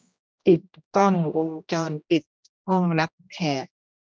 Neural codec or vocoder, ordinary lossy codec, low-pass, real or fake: codec, 16 kHz, 1 kbps, X-Codec, HuBERT features, trained on general audio; none; none; fake